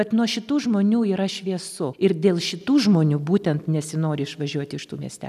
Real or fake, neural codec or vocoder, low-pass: real; none; 14.4 kHz